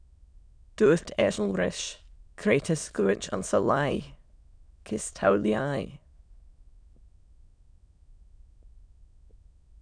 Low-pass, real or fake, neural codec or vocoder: 9.9 kHz; fake; autoencoder, 22.05 kHz, a latent of 192 numbers a frame, VITS, trained on many speakers